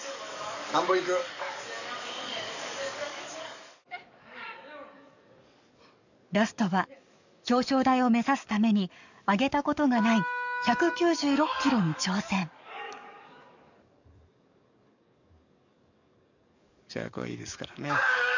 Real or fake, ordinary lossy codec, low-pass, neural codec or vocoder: fake; none; 7.2 kHz; codec, 44.1 kHz, 7.8 kbps, DAC